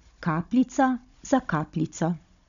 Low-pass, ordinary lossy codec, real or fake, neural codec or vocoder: 7.2 kHz; MP3, 96 kbps; fake; codec, 16 kHz, 16 kbps, FunCodec, trained on Chinese and English, 50 frames a second